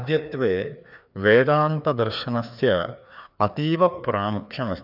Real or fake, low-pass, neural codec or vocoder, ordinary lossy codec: fake; 5.4 kHz; codec, 16 kHz, 2 kbps, FreqCodec, larger model; none